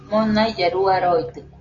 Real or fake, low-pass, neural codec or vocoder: real; 7.2 kHz; none